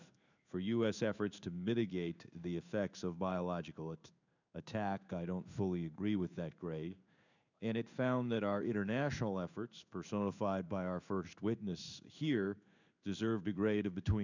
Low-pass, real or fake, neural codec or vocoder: 7.2 kHz; fake; codec, 16 kHz in and 24 kHz out, 1 kbps, XY-Tokenizer